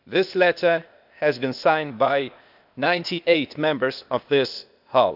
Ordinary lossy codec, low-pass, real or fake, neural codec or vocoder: none; 5.4 kHz; fake; codec, 16 kHz, 0.8 kbps, ZipCodec